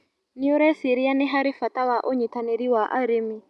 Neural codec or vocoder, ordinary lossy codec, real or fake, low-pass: none; none; real; none